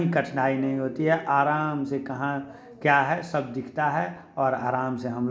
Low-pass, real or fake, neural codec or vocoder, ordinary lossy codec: none; real; none; none